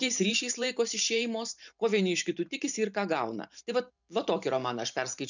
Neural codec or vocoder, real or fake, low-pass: vocoder, 44.1 kHz, 128 mel bands every 512 samples, BigVGAN v2; fake; 7.2 kHz